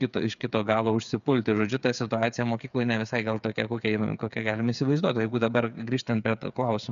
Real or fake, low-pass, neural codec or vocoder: fake; 7.2 kHz; codec, 16 kHz, 8 kbps, FreqCodec, smaller model